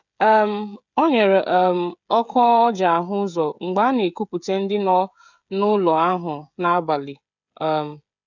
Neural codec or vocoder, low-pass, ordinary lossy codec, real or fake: codec, 16 kHz, 16 kbps, FreqCodec, smaller model; 7.2 kHz; none; fake